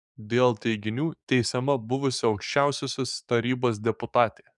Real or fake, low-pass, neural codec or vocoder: fake; 10.8 kHz; codec, 44.1 kHz, 7.8 kbps, DAC